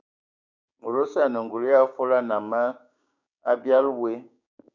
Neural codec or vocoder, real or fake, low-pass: codec, 16 kHz, 6 kbps, DAC; fake; 7.2 kHz